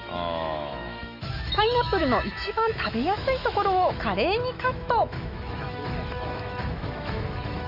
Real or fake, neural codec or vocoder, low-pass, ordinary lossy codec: fake; autoencoder, 48 kHz, 128 numbers a frame, DAC-VAE, trained on Japanese speech; 5.4 kHz; none